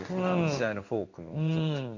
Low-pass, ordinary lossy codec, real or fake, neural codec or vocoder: 7.2 kHz; none; fake; codec, 16 kHz in and 24 kHz out, 1 kbps, XY-Tokenizer